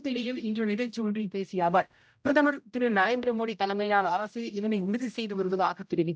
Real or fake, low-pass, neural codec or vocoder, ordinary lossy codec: fake; none; codec, 16 kHz, 0.5 kbps, X-Codec, HuBERT features, trained on general audio; none